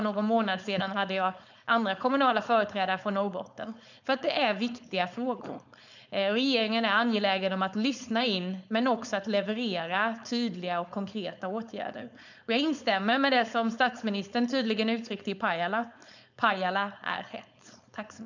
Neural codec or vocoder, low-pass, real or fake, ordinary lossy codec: codec, 16 kHz, 4.8 kbps, FACodec; 7.2 kHz; fake; none